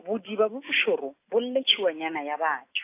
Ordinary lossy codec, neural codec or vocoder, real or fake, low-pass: AAC, 24 kbps; none; real; 3.6 kHz